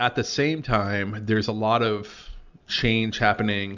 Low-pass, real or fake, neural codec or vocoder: 7.2 kHz; real; none